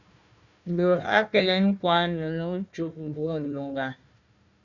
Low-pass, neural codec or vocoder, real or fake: 7.2 kHz; codec, 16 kHz, 1 kbps, FunCodec, trained on Chinese and English, 50 frames a second; fake